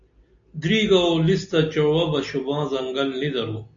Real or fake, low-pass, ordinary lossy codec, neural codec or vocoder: real; 7.2 kHz; AAC, 64 kbps; none